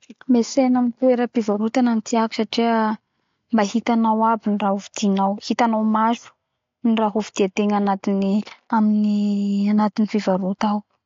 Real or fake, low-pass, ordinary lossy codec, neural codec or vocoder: real; 7.2 kHz; AAC, 48 kbps; none